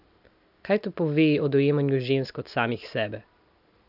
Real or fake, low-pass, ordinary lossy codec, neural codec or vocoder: real; 5.4 kHz; none; none